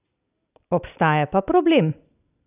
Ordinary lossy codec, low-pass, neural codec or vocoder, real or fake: none; 3.6 kHz; none; real